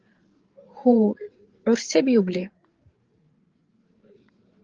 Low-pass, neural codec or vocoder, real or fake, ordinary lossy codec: 7.2 kHz; codec, 16 kHz, 8 kbps, FreqCodec, larger model; fake; Opus, 16 kbps